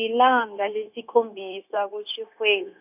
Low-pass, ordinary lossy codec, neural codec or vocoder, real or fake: 3.6 kHz; none; codec, 16 kHz, 0.9 kbps, LongCat-Audio-Codec; fake